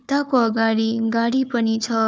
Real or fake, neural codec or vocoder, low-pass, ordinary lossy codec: fake; codec, 16 kHz, 4 kbps, FunCodec, trained on Chinese and English, 50 frames a second; none; none